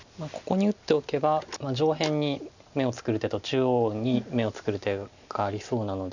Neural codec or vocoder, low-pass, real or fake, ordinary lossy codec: none; 7.2 kHz; real; none